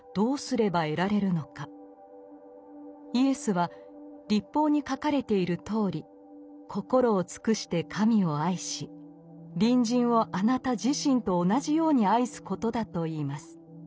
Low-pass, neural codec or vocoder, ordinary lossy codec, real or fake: none; none; none; real